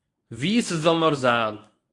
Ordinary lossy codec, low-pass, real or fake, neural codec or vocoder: AAC, 48 kbps; 10.8 kHz; fake; codec, 24 kHz, 0.9 kbps, WavTokenizer, medium speech release version 1